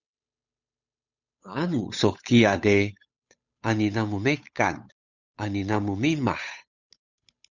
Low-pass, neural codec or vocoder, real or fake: 7.2 kHz; codec, 16 kHz, 8 kbps, FunCodec, trained on Chinese and English, 25 frames a second; fake